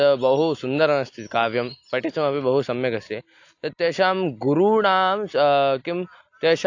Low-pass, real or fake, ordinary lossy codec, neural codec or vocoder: 7.2 kHz; real; AAC, 48 kbps; none